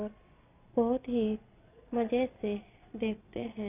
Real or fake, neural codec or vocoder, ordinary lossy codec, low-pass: fake; vocoder, 22.05 kHz, 80 mel bands, Vocos; none; 3.6 kHz